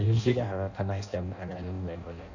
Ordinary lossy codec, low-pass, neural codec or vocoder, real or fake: none; 7.2 kHz; codec, 16 kHz in and 24 kHz out, 0.6 kbps, FireRedTTS-2 codec; fake